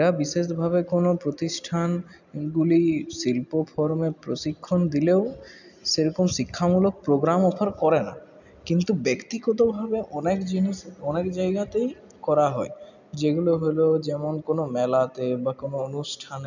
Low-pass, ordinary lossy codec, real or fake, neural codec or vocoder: 7.2 kHz; none; real; none